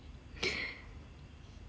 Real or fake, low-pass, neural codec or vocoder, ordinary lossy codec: real; none; none; none